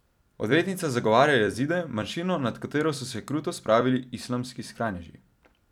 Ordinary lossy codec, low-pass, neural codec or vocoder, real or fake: none; 19.8 kHz; vocoder, 44.1 kHz, 128 mel bands every 256 samples, BigVGAN v2; fake